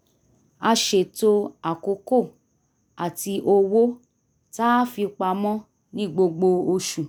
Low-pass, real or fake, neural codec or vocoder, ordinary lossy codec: none; real; none; none